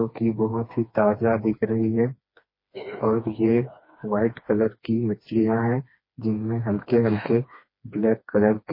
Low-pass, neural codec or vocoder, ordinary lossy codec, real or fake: 5.4 kHz; codec, 16 kHz, 2 kbps, FreqCodec, smaller model; MP3, 24 kbps; fake